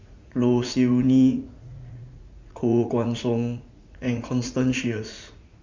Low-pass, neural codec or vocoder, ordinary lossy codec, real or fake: 7.2 kHz; vocoder, 44.1 kHz, 80 mel bands, Vocos; MP3, 64 kbps; fake